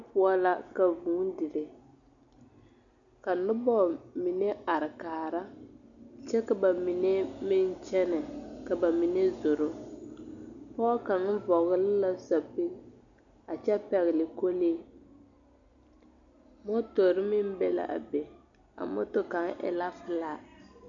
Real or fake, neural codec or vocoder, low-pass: real; none; 7.2 kHz